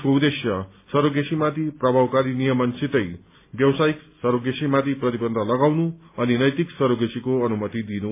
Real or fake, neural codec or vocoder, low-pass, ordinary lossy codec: real; none; 3.6 kHz; MP3, 24 kbps